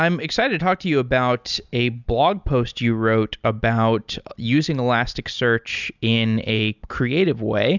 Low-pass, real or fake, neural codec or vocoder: 7.2 kHz; real; none